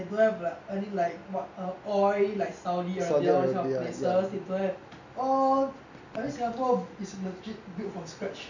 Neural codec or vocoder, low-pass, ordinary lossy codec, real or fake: none; 7.2 kHz; none; real